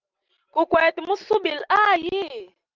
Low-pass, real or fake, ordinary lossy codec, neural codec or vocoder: 7.2 kHz; real; Opus, 32 kbps; none